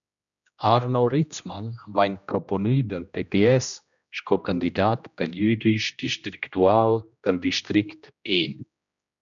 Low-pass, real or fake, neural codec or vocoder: 7.2 kHz; fake; codec, 16 kHz, 1 kbps, X-Codec, HuBERT features, trained on general audio